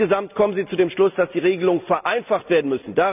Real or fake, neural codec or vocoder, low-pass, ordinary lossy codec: real; none; 3.6 kHz; none